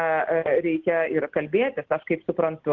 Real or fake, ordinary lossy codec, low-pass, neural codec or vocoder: real; Opus, 16 kbps; 7.2 kHz; none